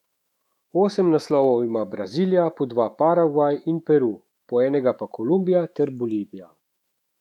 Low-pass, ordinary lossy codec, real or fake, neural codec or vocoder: 19.8 kHz; MP3, 96 kbps; fake; autoencoder, 48 kHz, 128 numbers a frame, DAC-VAE, trained on Japanese speech